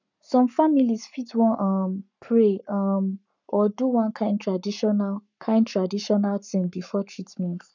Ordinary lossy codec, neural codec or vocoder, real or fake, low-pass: none; codec, 44.1 kHz, 7.8 kbps, Pupu-Codec; fake; 7.2 kHz